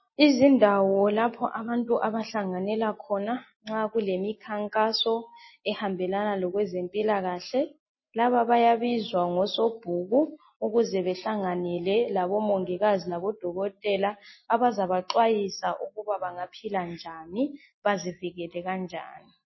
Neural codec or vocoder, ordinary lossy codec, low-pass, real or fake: none; MP3, 24 kbps; 7.2 kHz; real